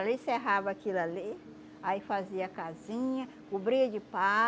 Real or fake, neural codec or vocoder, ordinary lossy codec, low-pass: real; none; none; none